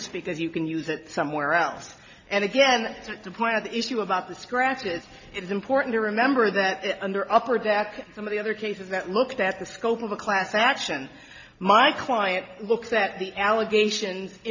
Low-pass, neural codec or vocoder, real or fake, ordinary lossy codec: 7.2 kHz; none; real; MP3, 48 kbps